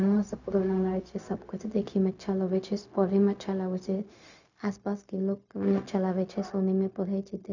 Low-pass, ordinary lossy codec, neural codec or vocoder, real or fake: 7.2 kHz; none; codec, 16 kHz, 0.4 kbps, LongCat-Audio-Codec; fake